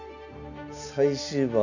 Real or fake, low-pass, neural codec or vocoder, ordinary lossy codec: real; 7.2 kHz; none; none